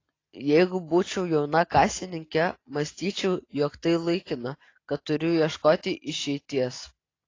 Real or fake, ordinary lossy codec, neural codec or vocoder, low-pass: real; AAC, 32 kbps; none; 7.2 kHz